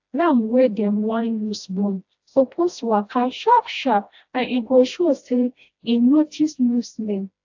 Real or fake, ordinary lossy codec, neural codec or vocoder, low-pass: fake; AAC, 48 kbps; codec, 16 kHz, 1 kbps, FreqCodec, smaller model; 7.2 kHz